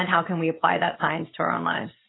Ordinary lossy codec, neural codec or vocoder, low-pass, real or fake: AAC, 16 kbps; none; 7.2 kHz; real